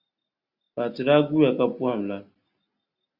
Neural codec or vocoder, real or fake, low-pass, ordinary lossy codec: none; real; 5.4 kHz; Opus, 64 kbps